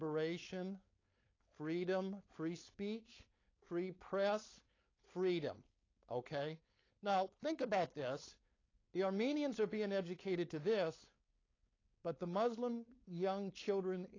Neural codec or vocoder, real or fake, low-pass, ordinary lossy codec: codec, 16 kHz, 4.8 kbps, FACodec; fake; 7.2 kHz; AAC, 32 kbps